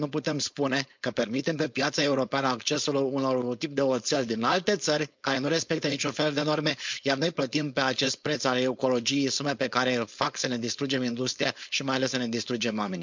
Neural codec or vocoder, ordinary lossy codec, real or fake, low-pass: codec, 16 kHz, 4.8 kbps, FACodec; MP3, 64 kbps; fake; 7.2 kHz